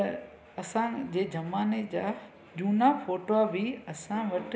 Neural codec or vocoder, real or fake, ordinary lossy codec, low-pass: none; real; none; none